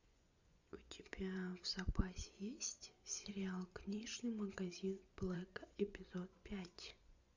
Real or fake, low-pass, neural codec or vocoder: fake; 7.2 kHz; vocoder, 24 kHz, 100 mel bands, Vocos